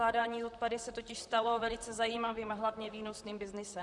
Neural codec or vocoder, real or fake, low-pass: vocoder, 44.1 kHz, 128 mel bands, Pupu-Vocoder; fake; 10.8 kHz